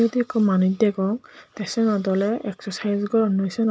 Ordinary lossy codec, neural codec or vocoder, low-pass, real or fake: none; none; none; real